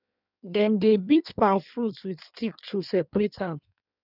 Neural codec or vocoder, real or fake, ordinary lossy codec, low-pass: codec, 16 kHz in and 24 kHz out, 1.1 kbps, FireRedTTS-2 codec; fake; none; 5.4 kHz